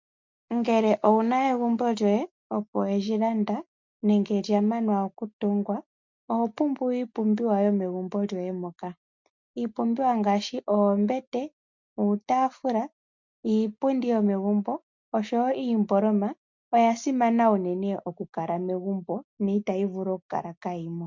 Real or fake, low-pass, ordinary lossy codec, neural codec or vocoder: real; 7.2 kHz; MP3, 64 kbps; none